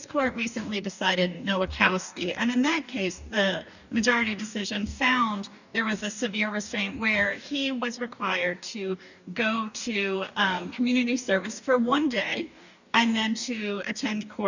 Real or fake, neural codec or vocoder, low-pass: fake; codec, 44.1 kHz, 2.6 kbps, DAC; 7.2 kHz